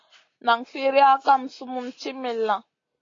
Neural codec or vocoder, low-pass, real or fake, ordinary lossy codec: none; 7.2 kHz; real; AAC, 32 kbps